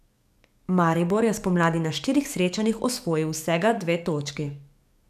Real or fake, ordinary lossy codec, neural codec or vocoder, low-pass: fake; none; codec, 44.1 kHz, 7.8 kbps, DAC; 14.4 kHz